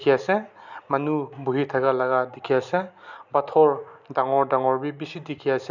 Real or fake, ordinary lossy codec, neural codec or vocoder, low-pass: real; none; none; 7.2 kHz